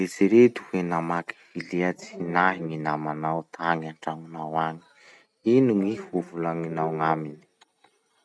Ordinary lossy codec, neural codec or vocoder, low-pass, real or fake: none; none; none; real